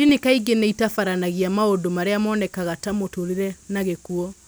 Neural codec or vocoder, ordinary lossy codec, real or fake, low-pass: none; none; real; none